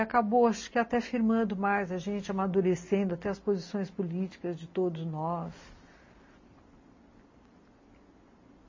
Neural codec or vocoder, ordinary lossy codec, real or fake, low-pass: none; MP3, 32 kbps; real; 7.2 kHz